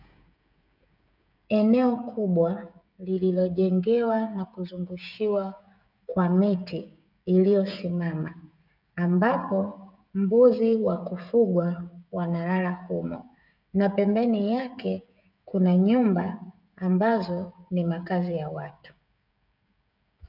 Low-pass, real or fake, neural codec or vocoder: 5.4 kHz; fake; codec, 16 kHz, 16 kbps, FreqCodec, smaller model